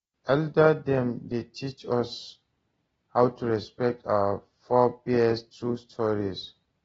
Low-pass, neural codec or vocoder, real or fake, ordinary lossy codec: 19.8 kHz; none; real; AAC, 24 kbps